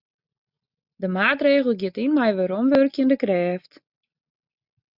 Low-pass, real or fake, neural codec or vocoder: 5.4 kHz; real; none